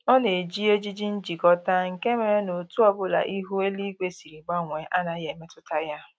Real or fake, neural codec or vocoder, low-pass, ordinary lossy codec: real; none; none; none